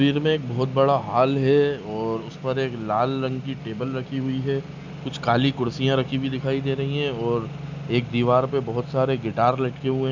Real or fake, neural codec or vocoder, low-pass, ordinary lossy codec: real; none; 7.2 kHz; none